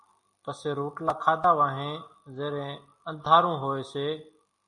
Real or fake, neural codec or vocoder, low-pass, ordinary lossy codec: real; none; 10.8 kHz; MP3, 48 kbps